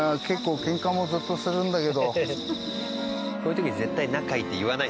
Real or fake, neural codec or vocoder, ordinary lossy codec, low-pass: real; none; none; none